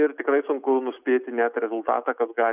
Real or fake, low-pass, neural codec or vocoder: real; 3.6 kHz; none